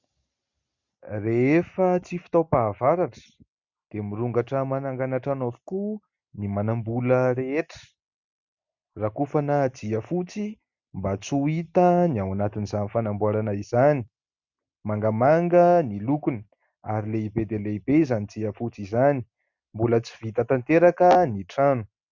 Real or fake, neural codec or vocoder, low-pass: real; none; 7.2 kHz